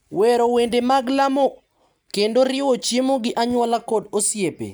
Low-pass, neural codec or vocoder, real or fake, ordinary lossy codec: none; none; real; none